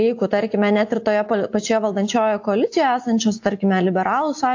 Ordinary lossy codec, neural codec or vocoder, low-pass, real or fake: AAC, 48 kbps; none; 7.2 kHz; real